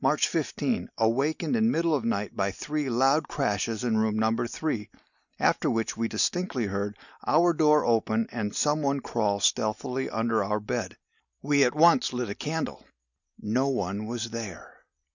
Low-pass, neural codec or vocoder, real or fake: 7.2 kHz; none; real